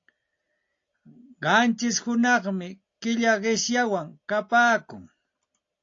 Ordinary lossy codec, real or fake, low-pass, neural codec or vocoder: AAC, 48 kbps; real; 7.2 kHz; none